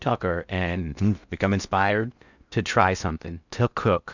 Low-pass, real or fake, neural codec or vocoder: 7.2 kHz; fake; codec, 16 kHz in and 24 kHz out, 0.6 kbps, FocalCodec, streaming, 2048 codes